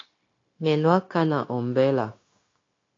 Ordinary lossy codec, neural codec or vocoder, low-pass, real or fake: AAC, 32 kbps; codec, 16 kHz, 0.9 kbps, LongCat-Audio-Codec; 7.2 kHz; fake